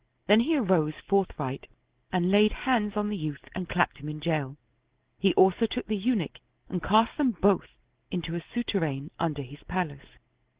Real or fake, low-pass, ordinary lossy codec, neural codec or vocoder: real; 3.6 kHz; Opus, 16 kbps; none